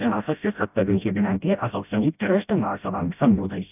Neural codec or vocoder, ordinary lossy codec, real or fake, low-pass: codec, 16 kHz, 0.5 kbps, FreqCodec, smaller model; none; fake; 3.6 kHz